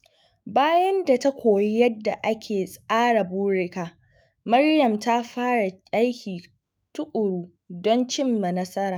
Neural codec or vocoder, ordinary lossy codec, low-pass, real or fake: autoencoder, 48 kHz, 128 numbers a frame, DAC-VAE, trained on Japanese speech; none; none; fake